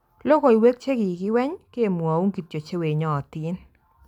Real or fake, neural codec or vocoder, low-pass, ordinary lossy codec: real; none; 19.8 kHz; none